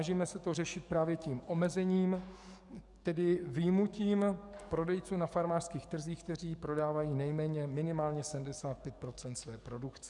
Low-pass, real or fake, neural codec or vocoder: 10.8 kHz; fake; codec, 44.1 kHz, 7.8 kbps, DAC